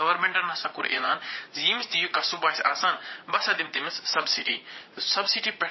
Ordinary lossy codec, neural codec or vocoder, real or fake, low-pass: MP3, 24 kbps; vocoder, 44.1 kHz, 80 mel bands, Vocos; fake; 7.2 kHz